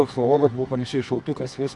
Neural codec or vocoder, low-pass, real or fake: codec, 24 kHz, 0.9 kbps, WavTokenizer, medium music audio release; 10.8 kHz; fake